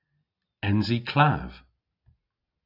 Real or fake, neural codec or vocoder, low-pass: real; none; 5.4 kHz